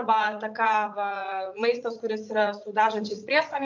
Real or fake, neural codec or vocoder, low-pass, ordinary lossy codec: fake; vocoder, 44.1 kHz, 80 mel bands, Vocos; 7.2 kHz; MP3, 64 kbps